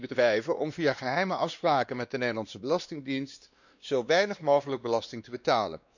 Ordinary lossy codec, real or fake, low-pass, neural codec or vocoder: none; fake; 7.2 kHz; codec, 16 kHz, 2 kbps, X-Codec, WavLM features, trained on Multilingual LibriSpeech